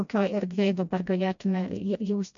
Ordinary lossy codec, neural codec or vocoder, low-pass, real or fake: AAC, 64 kbps; codec, 16 kHz, 1 kbps, FreqCodec, smaller model; 7.2 kHz; fake